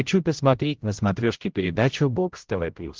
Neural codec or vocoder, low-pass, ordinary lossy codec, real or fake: codec, 16 kHz, 0.5 kbps, X-Codec, HuBERT features, trained on general audio; 7.2 kHz; Opus, 16 kbps; fake